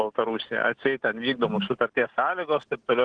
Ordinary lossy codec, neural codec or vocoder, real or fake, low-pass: Opus, 16 kbps; none; real; 9.9 kHz